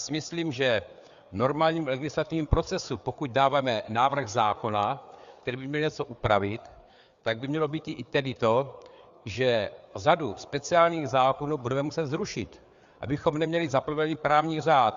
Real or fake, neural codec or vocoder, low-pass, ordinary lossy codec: fake; codec, 16 kHz, 4 kbps, FreqCodec, larger model; 7.2 kHz; Opus, 64 kbps